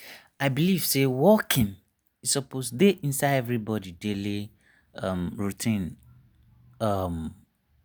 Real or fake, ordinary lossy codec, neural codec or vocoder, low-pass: real; none; none; none